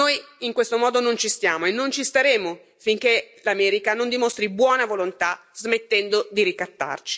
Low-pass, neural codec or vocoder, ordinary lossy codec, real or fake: none; none; none; real